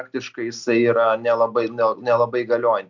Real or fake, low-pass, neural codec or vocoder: real; 7.2 kHz; none